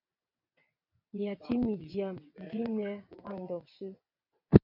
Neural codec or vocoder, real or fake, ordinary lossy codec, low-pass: vocoder, 22.05 kHz, 80 mel bands, WaveNeXt; fake; MP3, 48 kbps; 5.4 kHz